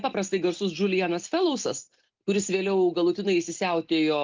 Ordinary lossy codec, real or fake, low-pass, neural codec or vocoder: Opus, 32 kbps; real; 7.2 kHz; none